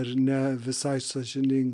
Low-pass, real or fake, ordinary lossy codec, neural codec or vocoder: 10.8 kHz; real; MP3, 64 kbps; none